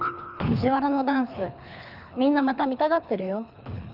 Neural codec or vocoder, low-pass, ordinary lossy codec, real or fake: codec, 24 kHz, 3 kbps, HILCodec; 5.4 kHz; none; fake